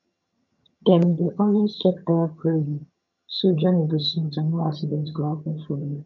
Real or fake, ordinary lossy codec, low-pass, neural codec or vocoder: fake; none; 7.2 kHz; vocoder, 22.05 kHz, 80 mel bands, HiFi-GAN